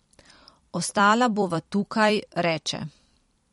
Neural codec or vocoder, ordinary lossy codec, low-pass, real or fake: vocoder, 44.1 kHz, 128 mel bands every 256 samples, BigVGAN v2; MP3, 48 kbps; 19.8 kHz; fake